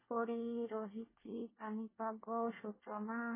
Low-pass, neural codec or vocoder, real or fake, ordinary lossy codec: 3.6 kHz; codec, 24 kHz, 1 kbps, SNAC; fake; MP3, 16 kbps